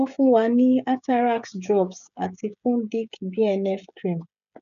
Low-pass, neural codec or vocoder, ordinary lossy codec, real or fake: 7.2 kHz; codec, 16 kHz, 16 kbps, FreqCodec, smaller model; none; fake